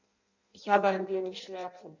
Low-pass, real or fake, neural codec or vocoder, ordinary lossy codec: 7.2 kHz; fake; codec, 16 kHz in and 24 kHz out, 0.6 kbps, FireRedTTS-2 codec; none